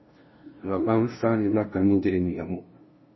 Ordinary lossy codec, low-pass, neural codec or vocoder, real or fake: MP3, 24 kbps; 7.2 kHz; codec, 16 kHz, 0.5 kbps, FunCodec, trained on LibriTTS, 25 frames a second; fake